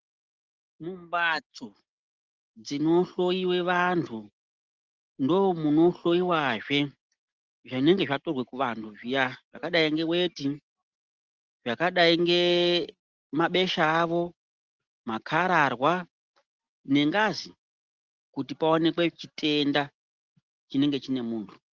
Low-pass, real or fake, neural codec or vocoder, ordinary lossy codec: 7.2 kHz; real; none; Opus, 16 kbps